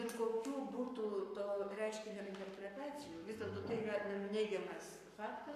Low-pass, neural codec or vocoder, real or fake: 14.4 kHz; codec, 44.1 kHz, 7.8 kbps, Pupu-Codec; fake